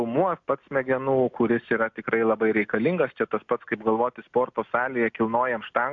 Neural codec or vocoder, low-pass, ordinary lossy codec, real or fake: none; 7.2 kHz; AAC, 64 kbps; real